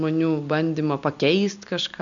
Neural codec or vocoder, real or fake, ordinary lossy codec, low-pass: none; real; MP3, 64 kbps; 7.2 kHz